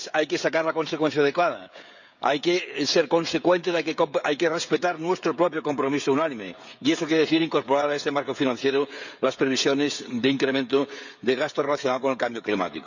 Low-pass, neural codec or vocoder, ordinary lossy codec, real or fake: 7.2 kHz; codec, 16 kHz, 16 kbps, FreqCodec, smaller model; none; fake